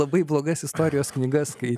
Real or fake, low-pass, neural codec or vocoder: real; 14.4 kHz; none